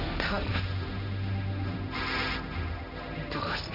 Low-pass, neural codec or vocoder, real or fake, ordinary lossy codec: 5.4 kHz; codec, 16 kHz, 1.1 kbps, Voila-Tokenizer; fake; none